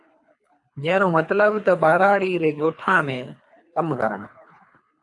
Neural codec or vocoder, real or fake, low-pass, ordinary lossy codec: codec, 24 kHz, 3 kbps, HILCodec; fake; 10.8 kHz; AAC, 64 kbps